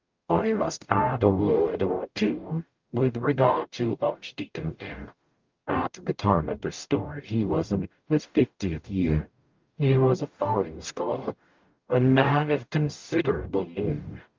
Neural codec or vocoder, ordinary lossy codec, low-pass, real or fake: codec, 44.1 kHz, 0.9 kbps, DAC; Opus, 24 kbps; 7.2 kHz; fake